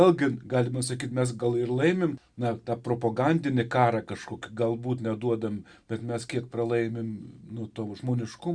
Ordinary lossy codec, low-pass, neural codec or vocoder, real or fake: Opus, 64 kbps; 9.9 kHz; none; real